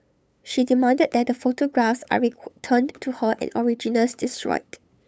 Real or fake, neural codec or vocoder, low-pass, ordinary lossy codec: fake; codec, 16 kHz, 8 kbps, FunCodec, trained on LibriTTS, 25 frames a second; none; none